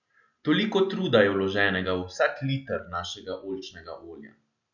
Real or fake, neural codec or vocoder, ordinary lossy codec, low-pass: real; none; none; 7.2 kHz